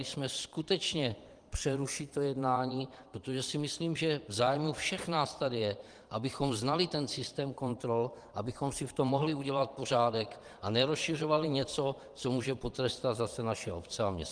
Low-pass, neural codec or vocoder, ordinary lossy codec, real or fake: 9.9 kHz; vocoder, 22.05 kHz, 80 mel bands, Vocos; Opus, 24 kbps; fake